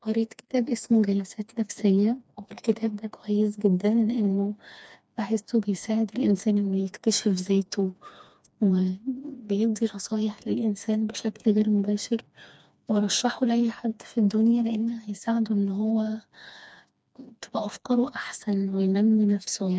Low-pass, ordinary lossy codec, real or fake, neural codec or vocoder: none; none; fake; codec, 16 kHz, 2 kbps, FreqCodec, smaller model